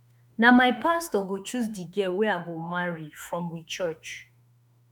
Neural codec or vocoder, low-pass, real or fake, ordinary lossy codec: autoencoder, 48 kHz, 32 numbers a frame, DAC-VAE, trained on Japanese speech; none; fake; none